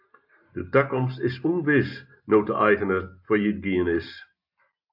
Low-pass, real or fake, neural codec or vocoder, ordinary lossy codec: 5.4 kHz; real; none; AAC, 48 kbps